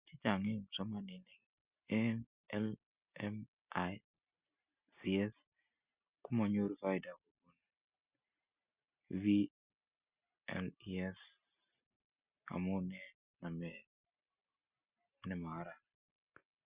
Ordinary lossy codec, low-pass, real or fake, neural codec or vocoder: Opus, 64 kbps; 3.6 kHz; real; none